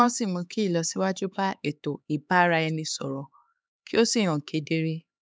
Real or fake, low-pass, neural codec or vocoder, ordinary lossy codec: fake; none; codec, 16 kHz, 4 kbps, X-Codec, HuBERT features, trained on LibriSpeech; none